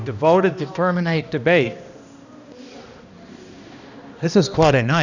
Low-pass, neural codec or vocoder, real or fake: 7.2 kHz; codec, 16 kHz, 1 kbps, X-Codec, HuBERT features, trained on balanced general audio; fake